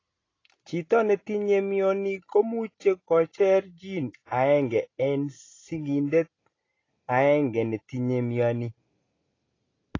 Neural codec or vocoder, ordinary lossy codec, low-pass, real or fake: none; AAC, 32 kbps; 7.2 kHz; real